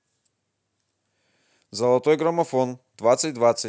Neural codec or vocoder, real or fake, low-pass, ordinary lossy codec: none; real; none; none